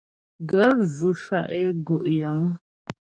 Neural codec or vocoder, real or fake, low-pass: codec, 44.1 kHz, 2.6 kbps, DAC; fake; 9.9 kHz